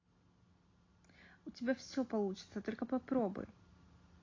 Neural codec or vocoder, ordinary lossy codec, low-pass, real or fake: none; AAC, 32 kbps; 7.2 kHz; real